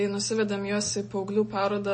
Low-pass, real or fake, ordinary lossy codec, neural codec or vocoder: 9.9 kHz; real; MP3, 32 kbps; none